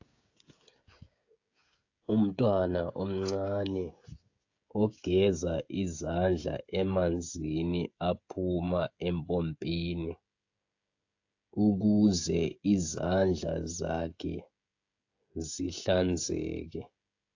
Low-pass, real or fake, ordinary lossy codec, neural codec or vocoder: 7.2 kHz; fake; AAC, 48 kbps; codec, 16 kHz, 16 kbps, FreqCodec, smaller model